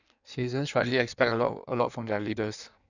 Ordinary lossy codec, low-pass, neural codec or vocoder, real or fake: none; 7.2 kHz; codec, 16 kHz in and 24 kHz out, 1.1 kbps, FireRedTTS-2 codec; fake